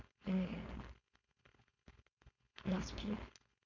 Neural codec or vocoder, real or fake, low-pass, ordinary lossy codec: codec, 16 kHz, 4.8 kbps, FACodec; fake; 7.2 kHz; none